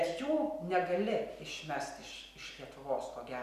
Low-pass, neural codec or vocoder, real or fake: 14.4 kHz; none; real